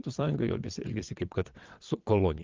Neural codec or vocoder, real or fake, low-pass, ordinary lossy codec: vocoder, 22.05 kHz, 80 mel bands, WaveNeXt; fake; 7.2 kHz; Opus, 24 kbps